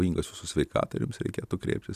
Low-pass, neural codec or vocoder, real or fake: 14.4 kHz; none; real